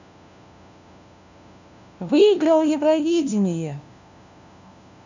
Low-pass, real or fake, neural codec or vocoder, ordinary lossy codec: 7.2 kHz; fake; codec, 16 kHz, 1 kbps, FunCodec, trained on LibriTTS, 50 frames a second; none